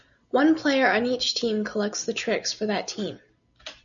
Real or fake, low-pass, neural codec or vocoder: real; 7.2 kHz; none